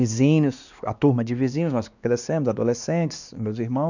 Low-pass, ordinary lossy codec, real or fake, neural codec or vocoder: 7.2 kHz; none; fake; codec, 16 kHz, 2 kbps, X-Codec, WavLM features, trained on Multilingual LibriSpeech